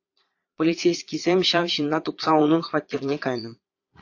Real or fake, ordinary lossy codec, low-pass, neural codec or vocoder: fake; AAC, 48 kbps; 7.2 kHz; vocoder, 22.05 kHz, 80 mel bands, Vocos